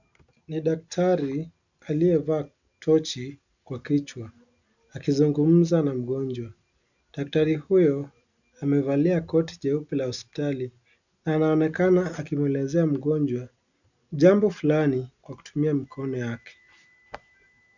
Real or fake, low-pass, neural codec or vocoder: real; 7.2 kHz; none